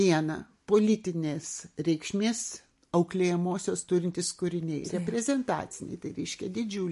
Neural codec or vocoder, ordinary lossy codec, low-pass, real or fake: none; MP3, 48 kbps; 14.4 kHz; real